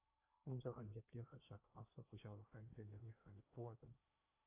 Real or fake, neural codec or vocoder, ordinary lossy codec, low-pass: fake; codec, 16 kHz in and 24 kHz out, 0.8 kbps, FocalCodec, streaming, 65536 codes; AAC, 32 kbps; 3.6 kHz